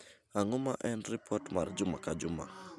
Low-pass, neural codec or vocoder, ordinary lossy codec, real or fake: none; none; none; real